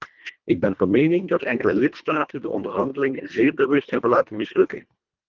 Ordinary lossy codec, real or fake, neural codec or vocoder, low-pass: Opus, 24 kbps; fake; codec, 24 kHz, 1.5 kbps, HILCodec; 7.2 kHz